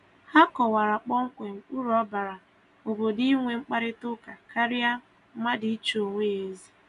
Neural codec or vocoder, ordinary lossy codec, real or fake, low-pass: none; none; real; 10.8 kHz